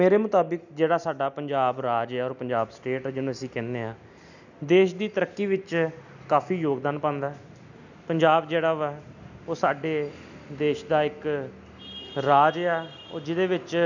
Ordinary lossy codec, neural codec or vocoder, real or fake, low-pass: none; none; real; 7.2 kHz